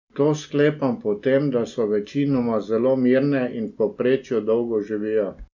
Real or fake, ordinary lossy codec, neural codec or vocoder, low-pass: real; none; none; 7.2 kHz